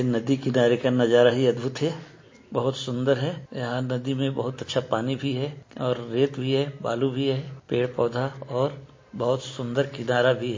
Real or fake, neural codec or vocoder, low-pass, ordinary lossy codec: real; none; 7.2 kHz; MP3, 32 kbps